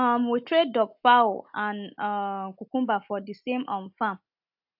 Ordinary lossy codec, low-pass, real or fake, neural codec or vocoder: AAC, 48 kbps; 5.4 kHz; real; none